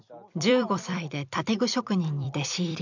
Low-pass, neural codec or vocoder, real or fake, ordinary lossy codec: 7.2 kHz; vocoder, 44.1 kHz, 128 mel bands every 256 samples, BigVGAN v2; fake; none